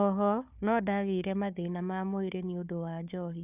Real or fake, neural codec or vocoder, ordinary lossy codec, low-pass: fake; codec, 16 kHz, 4 kbps, FunCodec, trained on Chinese and English, 50 frames a second; none; 3.6 kHz